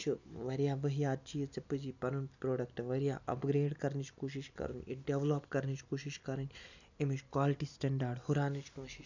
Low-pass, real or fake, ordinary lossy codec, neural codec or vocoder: 7.2 kHz; real; none; none